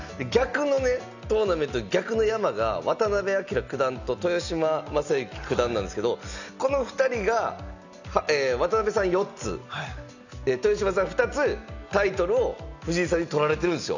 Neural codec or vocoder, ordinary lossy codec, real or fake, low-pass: none; none; real; 7.2 kHz